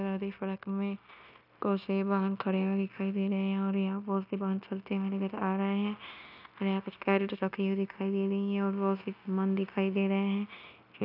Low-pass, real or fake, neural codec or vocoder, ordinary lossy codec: 5.4 kHz; fake; codec, 16 kHz, 0.9 kbps, LongCat-Audio-Codec; none